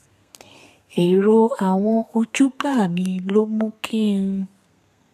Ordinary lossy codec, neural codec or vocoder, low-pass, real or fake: none; codec, 32 kHz, 1.9 kbps, SNAC; 14.4 kHz; fake